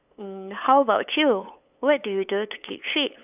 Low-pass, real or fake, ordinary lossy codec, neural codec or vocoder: 3.6 kHz; fake; none; codec, 16 kHz, 8 kbps, FunCodec, trained on LibriTTS, 25 frames a second